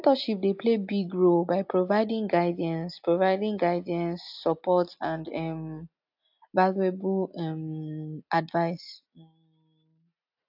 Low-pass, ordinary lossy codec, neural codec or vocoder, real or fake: 5.4 kHz; AAC, 48 kbps; none; real